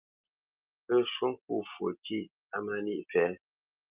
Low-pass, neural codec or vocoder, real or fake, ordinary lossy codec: 3.6 kHz; none; real; Opus, 24 kbps